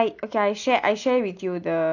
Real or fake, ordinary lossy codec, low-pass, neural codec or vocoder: real; none; 7.2 kHz; none